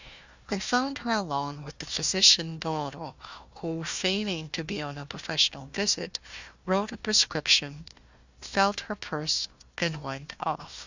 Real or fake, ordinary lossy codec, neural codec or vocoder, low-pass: fake; Opus, 64 kbps; codec, 16 kHz, 1 kbps, FunCodec, trained on Chinese and English, 50 frames a second; 7.2 kHz